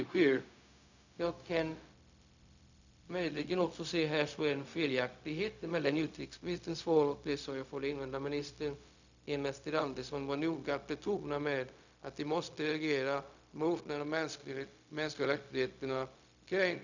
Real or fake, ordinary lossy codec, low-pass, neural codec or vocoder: fake; none; 7.2 kHz; codec, 16 kHz, 0.4 kbps, LongCat-Audio-Codec